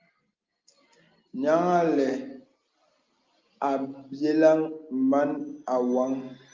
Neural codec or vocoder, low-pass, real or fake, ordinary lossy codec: none; 7.2 kHz; real; Opus, 32 kbps